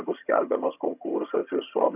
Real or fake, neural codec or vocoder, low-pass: fake; vocoder, 22.05 kHz, 80 mel bands, HiFi-GAN; 3.6 kHz